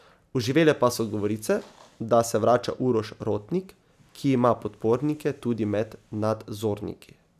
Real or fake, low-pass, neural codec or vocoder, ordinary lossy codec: real; 14.4 kHz; none; none